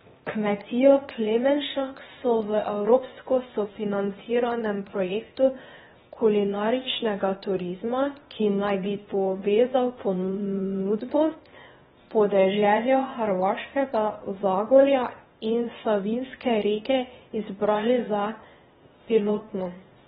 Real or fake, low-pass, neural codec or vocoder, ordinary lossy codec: fake; 7.2 kHz; codec, 16 kHz, 0.8 kbps, ZipCodec; AAC, 16 kbps